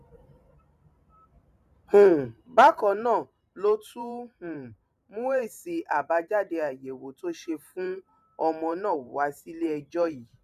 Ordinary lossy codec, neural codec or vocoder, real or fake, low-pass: none; vocoder, 44.1 kHz, 128 mel bands every 512 samples, BigVGAN v2; fake; 14.4 kHz